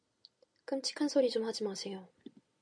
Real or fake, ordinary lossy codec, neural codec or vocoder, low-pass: real; MP3, 96 kbps; none; 9.9 kHz